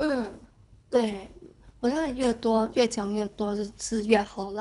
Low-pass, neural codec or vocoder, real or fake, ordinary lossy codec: 10.8 kHz; codec, 24 kHz, 3 kbps, HILCodec; fake; none